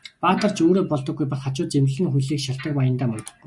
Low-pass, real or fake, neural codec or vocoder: 10.8 kHz; real; none